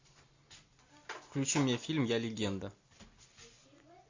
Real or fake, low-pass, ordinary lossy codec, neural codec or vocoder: real; 7.2 kHz; AAC, 48 kbps; none